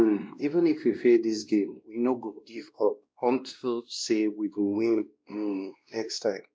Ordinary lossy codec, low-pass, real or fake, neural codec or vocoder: none; none; fake; codec, 16 kHz, 1 kbps, X-Codec, WavLM features, trained on Multilingual LibriSpeech